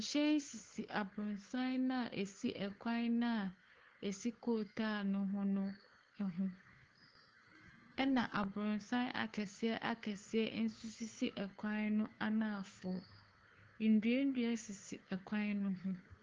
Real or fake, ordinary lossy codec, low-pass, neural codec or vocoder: fake; Opus, 16 kbps; 7.2 kHz; codec, 16 kHz, 2 kbps, FunCodec, trained on Chinese and English, 25 frames a second